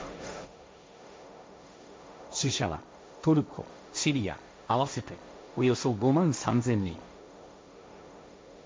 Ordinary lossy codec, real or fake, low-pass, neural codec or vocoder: none; fake; none; codec, 16 kHz, 1.1 kbps, Voila-Tokenizer